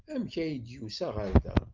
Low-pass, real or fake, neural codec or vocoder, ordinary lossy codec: 7.2 kHz; real; none; Opus, 24 kbps